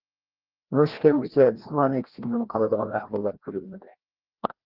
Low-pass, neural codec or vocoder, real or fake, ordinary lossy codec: 5.4 kHz; codec, 16 kHz, 1 kbps, FreqCodec, larger model; fake; Opus, 16 kbps